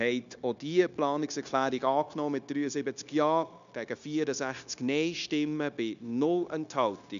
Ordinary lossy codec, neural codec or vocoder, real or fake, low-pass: MP3, 96 kbps; codec, 16 kHz, 0.9 kbps, LongCat-Audio-Codec; fake; 7.2 kHz